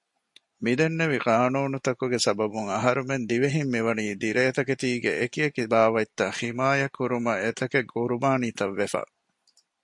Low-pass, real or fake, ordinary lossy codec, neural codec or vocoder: 10.8 kHz; real; MP3, 48 kbps; none